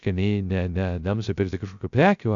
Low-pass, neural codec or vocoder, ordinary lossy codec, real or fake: 7.2 kHz; codec, 16 kHz, 0.3 kbps, FocalCodec; AAC, 64 kbps; fake